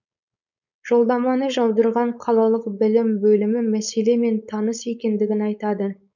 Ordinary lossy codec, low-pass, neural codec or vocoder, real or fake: none; 7.2 kHz; codec, 16 kHz, 4.8 kbps, FACodec; fake